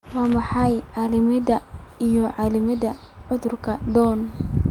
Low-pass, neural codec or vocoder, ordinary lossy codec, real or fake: 19.8 kHz; none; Opus, 32 kbps; real